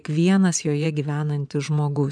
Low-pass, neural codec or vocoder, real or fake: 9.9 kHz; vocoder, 24 kHz, 100 mel bands, Vocos; fake